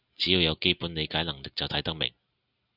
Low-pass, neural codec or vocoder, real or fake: 5.4 kHz; none; real